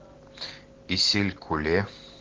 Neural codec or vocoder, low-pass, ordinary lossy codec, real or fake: none; 7.2 kHz; Opus, 16 kbps; real